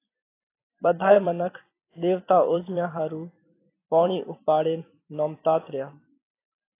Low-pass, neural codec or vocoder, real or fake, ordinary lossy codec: 3.6 kHz; vocoder, 44.1 kHz, 128 mel bands every 256 samples, BigVGAN v2; fake; AAC, 24 kbps